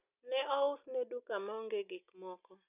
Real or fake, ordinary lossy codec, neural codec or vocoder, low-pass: real; none; none; 3.6 kHz